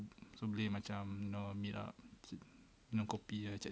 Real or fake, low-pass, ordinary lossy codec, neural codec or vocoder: real; none; none; none